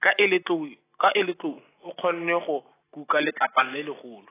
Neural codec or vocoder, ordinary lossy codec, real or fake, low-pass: none; AAC, 16 kbps; real; 3.6 kHz